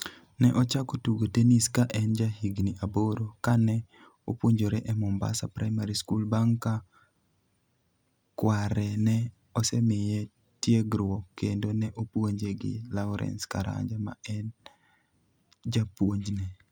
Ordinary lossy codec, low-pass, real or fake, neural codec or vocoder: none; none; real; none